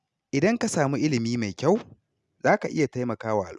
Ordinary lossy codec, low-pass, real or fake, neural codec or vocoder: none; none; real; none